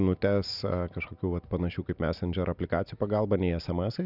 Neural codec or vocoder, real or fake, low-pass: none; real; 5.4 kHz